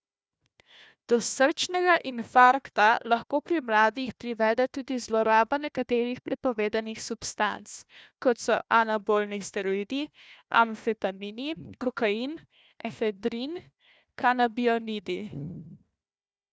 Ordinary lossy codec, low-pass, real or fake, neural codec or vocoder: none; none; fake; codec, 16 kHz, 1 kbps, FunCodec, trained on Chinese and English, 50 frames a second